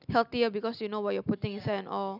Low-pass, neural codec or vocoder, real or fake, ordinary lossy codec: 5.4 kHz; none; real; none